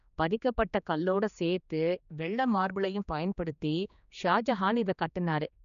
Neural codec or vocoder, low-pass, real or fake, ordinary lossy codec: codec, 16 kHz, 2 kbps, X-Codec, HuBERT features, trained on general audio; 7.2 kHz; fake; none